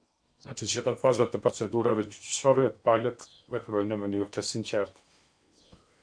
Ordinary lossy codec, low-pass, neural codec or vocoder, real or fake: MP3, 96 kbps; 9.9 kHz; codec, 16 kHz in and 24 kHz out, 0.8 kbps, FocalCodec, streaming, 65536 codes; fake